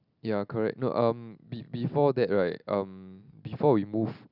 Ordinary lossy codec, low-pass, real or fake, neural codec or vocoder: none; 5.4 kHz; real; none